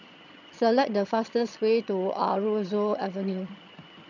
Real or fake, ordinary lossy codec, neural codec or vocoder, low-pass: fake; none; vocoder, 22.05 kHz, 80 mel bands, HiFi-GAN; 7.2 kHz